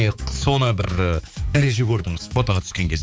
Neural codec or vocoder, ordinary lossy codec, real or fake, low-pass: codec, 16 kHz, 4 kbps, X-Codec, HuBERT features, trained on balanced general audio; none; fake; none